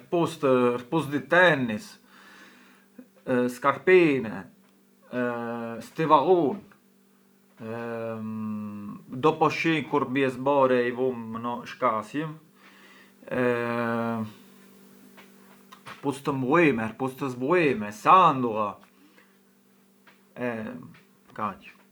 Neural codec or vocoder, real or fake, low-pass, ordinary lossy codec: none; real; none; none